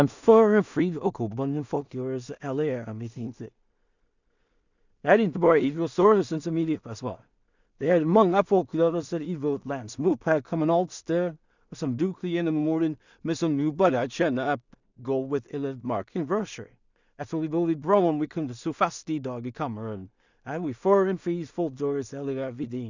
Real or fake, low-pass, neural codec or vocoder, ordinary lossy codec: fake; 7.2 kHz; codec, 16 kHz in and 24 kHz out, 0.4 kbps, LongCat-Audio-Codec, two codebook decoder; none